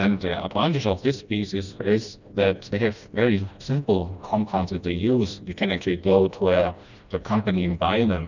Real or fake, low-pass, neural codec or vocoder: fake; 7.2 kHz; codec, 16 kHz, 1 kbps, FreqCodec, smaller model